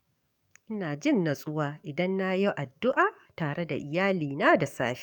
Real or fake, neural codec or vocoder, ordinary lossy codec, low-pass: fake; codec, 44.1 kHz, 7.8 kbps, DAC; none; 19.8 kHz